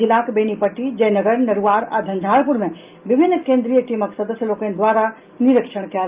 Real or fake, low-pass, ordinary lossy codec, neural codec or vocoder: real; 3.6 kHz; Opus, 32 kbps; none